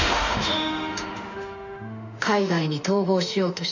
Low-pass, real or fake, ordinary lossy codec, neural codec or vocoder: 7.2 kHz; fake; none; autoencoder, 48 kHz, 32 numbers a frame, DAC-VAE, trained on Japanese speech